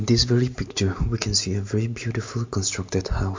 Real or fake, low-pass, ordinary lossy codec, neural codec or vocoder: real; 7.2 kHz; MP3, 48 kbps; none